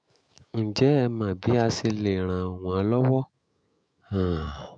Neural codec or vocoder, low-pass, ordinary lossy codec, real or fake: autoencoder, 48 kHz, 128 numbers a frame, DAC-VAE, trained on Japanese speech; 9.9 kHz; AAC, 64 kbps; fake